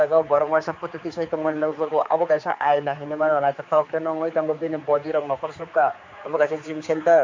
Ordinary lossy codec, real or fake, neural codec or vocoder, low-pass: MP3, 48 kbps; fake; codec, 16 kHz, 4 kbps, X-Codec, HuBERT features, trained on general audio; 7.2 kHz